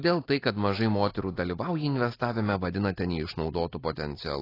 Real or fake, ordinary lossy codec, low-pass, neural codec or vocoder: real; AAC, 24 kbps; 5.4 kHz; none